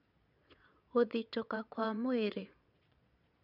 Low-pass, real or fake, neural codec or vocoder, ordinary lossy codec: 5.4 kHz; fake; vocoder, 22.05 kHz, 80 mel bands, Vocos; none